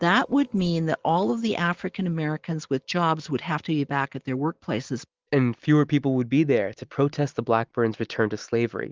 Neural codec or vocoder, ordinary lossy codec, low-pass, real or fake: none; Opus, 24 kbps; 7.2 kHz; real